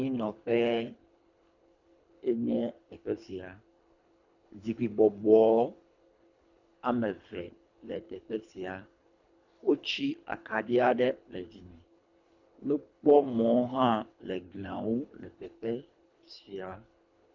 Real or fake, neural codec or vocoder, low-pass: fake; codec, 24 kHz, 3 kbps, HILCodec; 7.2 kHz